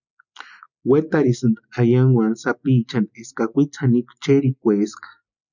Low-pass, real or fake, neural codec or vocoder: 7.2 kHz; real; none